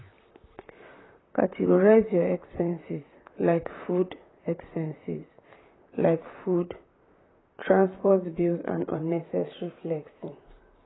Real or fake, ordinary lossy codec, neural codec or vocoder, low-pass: fake; AAC, 16 kbps; vocoder, 44.1 kHz, 128 mel bands, Pupu-Vocoder; 7.2 kHz